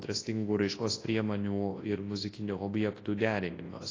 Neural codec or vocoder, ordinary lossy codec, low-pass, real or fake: codec, 24 kHz, 0.9 kbps, WavTokenizer, large speech release; AAC, 32 kbps; 7.2 kHz; fake